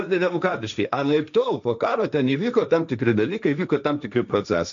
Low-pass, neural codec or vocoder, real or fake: 7.2 kHz; codec, 16 kHz, 1.1 kbps, Voila-Tokenizer; fake